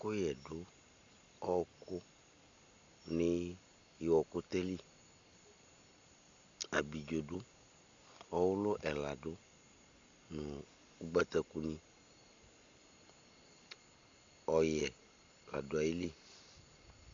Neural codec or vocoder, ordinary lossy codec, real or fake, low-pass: none; Opus, 64 kbps; real; 7.2 kHz